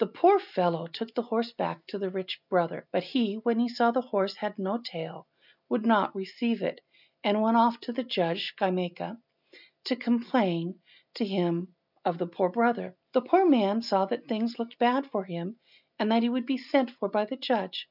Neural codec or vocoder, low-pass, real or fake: none; 5.4 kHz; real